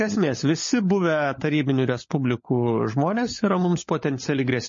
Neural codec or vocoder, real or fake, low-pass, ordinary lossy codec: codec, 16 kHz, 16 kbps, FunCodec, trained on LibriTTS, 50 frames a second; fake; 7.2 kHz; MP3, 32 kbps